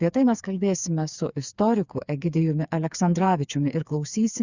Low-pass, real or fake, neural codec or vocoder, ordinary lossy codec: 7.2 kHz; fake; codec, 16 kHz, 4 kbps, FreqCodec, smaller model; Opus, 64 kbps